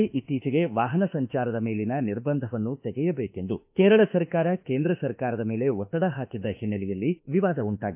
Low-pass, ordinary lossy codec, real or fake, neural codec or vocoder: 3.6 kHz; AAC, 32 kbps; fake; autoencoder, 48 kHz, 32 numbers a frame, DAC-VAE, trained on Japanese speech